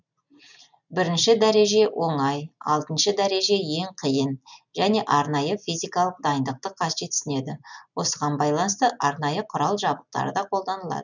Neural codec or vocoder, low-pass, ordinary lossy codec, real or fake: none; 7.2 kHz; none; real